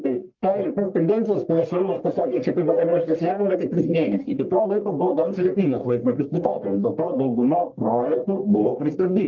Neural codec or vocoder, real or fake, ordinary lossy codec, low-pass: codec, 44.1 kHz, 1.7 kbps, Pupu-Codec; fake; Opus, 32 kbps; 7.2 kHz